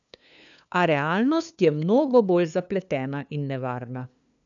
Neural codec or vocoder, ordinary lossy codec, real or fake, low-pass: codec, 16 kHz, 2 kbps, FunCodec, trained on LibriTTS, 25 frames a second; none; fake; 7.2 kHz